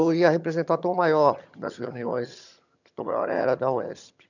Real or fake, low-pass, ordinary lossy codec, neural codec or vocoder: fake; 7.2 kHz; none; vocoder, 22.05 kHz, 80 mel bands, HiFi-GAN